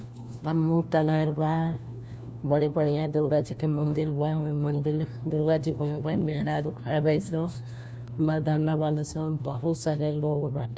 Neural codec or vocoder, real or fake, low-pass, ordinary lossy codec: codec, 16 kHz, 1 kbps, FunCodec, trained on LibriTTS, 50 frames a second; fake; none; none